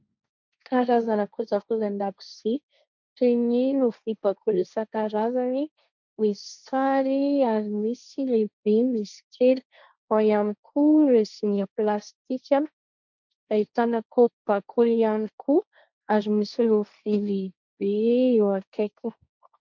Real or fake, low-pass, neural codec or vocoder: fake; 7.2 kHz; codec, 16 kHz, 1.1 kbps, Voila-Tokenizer